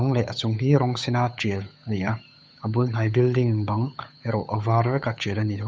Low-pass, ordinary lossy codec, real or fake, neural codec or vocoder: none; none; fake; codec, 16 kHz, 8 kbps, FunCodec, trained on Chinese and English, 25 frames a second